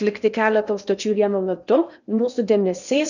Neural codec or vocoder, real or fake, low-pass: codec, 16 kHz in and 24 kHz out, 0.8 kbps, FocalCodec, streaming, 65536 codes; fake; 7.2 kHz